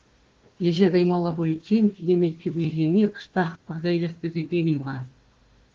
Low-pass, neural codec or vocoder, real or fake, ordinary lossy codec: 7.2 kHz; codec, 16 kHz, 1 kbps, FunCodec, trained on Chinese and English, 50 frames a second; fake; Opus, 16 kbps